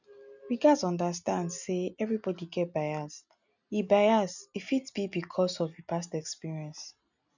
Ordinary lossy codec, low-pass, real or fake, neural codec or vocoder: none; 7.2 kHz; real; none